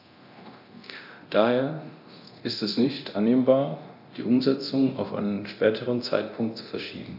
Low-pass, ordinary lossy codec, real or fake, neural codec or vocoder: 5.4 kHz; none; fake; codec, 24 kHz, 0.9 kbps, DualCodec